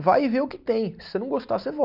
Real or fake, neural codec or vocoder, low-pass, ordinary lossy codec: real; none; 5.4 kHz; none